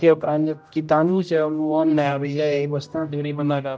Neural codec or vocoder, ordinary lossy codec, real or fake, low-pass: codec, 16 kHz, 0.5 kbps, X-Codec, HuBERT features, trained on general audio; none; fake; none